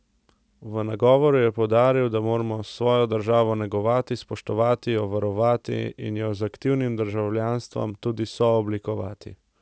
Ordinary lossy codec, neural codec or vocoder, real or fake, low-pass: none; none; real; none